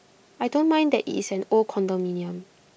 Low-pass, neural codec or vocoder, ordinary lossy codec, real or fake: none; none; none; real